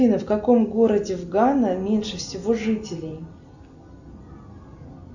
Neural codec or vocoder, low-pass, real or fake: none; 7.2 kHz; real